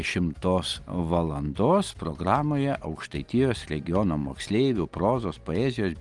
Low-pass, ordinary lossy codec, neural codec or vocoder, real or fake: 10.8 kHz; Opus, 24 kbps; none; real